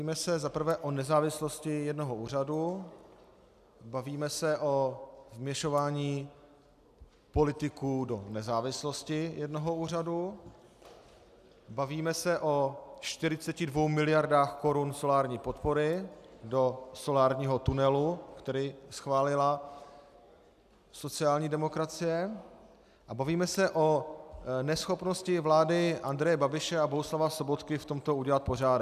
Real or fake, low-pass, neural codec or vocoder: real; 14.4 kHz; none